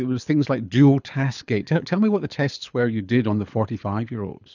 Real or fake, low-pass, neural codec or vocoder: fake; 7.2 kHz; codec, 24 kHz, 6 kbps, HILCodec